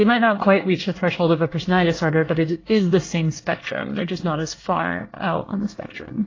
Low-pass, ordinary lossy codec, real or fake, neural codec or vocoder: 7.2 kHz; AAC, 32 kbps; fake; codec, 24 kHz, 1 kbps, SNAC